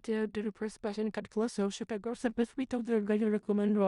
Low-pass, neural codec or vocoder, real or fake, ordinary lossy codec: 10.8 kHz; codec, 16 kHz in and 24 kHz out, 0.4 kbps, LongCat-Audio-Codec, four codebook decoder; fake; Opus, 64 kbps